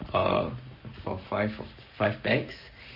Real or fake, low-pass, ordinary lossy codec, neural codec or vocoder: fake; 5.4 kHz; MP3, 32 kbps; codec, 44.1 kHz, 7.8 kbps, Pupu-Codec